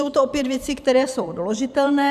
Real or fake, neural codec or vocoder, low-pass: fake; vocoder, 44.1 kHz, 128 mel bands every 512 samples, BigVGAN v2; 14.4 kHz